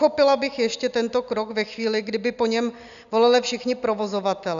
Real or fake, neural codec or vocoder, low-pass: real; none; 7.2 kHz